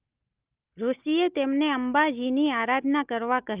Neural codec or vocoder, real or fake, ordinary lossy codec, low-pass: none; real; Opus, 24 kbps; 3.6 kHz